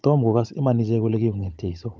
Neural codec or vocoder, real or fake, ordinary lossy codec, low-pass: codec, 16 kHz, 16 kbps, FunCodec, trained on Chinese and English, 50 frames a second; fake; none; none